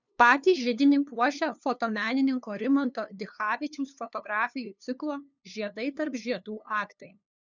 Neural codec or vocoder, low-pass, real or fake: codec, 16 kHz, 2 kbps, FunCodec, trained on LibriTTS, 25 frames a second; 7.2 kHz; fake